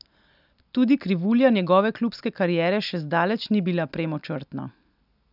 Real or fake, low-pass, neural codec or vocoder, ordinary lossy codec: real; 5.4 kHz; none; none